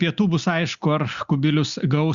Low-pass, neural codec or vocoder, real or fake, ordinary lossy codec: 7.2 kHz; none; real; Opus, 64 kbps